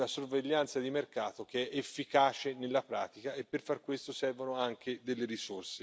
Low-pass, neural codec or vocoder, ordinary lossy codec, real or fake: none; none; none; real